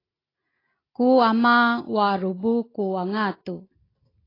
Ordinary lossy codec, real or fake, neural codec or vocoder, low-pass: AAC, 24 kbps; real; none; 5.4 kHz